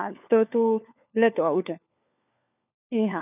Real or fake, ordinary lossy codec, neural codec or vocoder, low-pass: fake; none; codec, 16 kHz, 4 kbps, FunCodec, trained on LibriTTS, 50 frames a second; 3.6 kHz